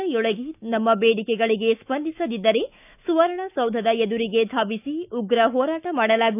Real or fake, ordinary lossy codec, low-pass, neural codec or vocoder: fake; none; 3.6 kHz; autoencoder, 48 kHz, 128 numbers a frame, DAC-VAE, trained on Japanese speech